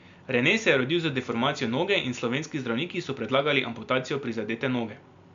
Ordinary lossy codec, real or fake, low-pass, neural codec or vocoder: MP3, 64 kbps; real; 7.2 kHz; none